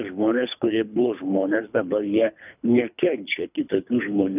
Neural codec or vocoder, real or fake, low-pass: codec, 24 kHz, 3 kbps, HILCodec; fake; 3.6 kHz